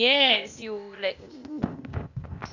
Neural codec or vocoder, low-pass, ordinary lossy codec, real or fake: codec, 16 kHz, 0.8 kbps, ZipCodec; 7.2 kHz; none; fake